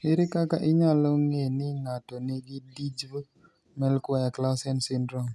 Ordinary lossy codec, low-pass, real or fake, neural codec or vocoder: none; none; real; none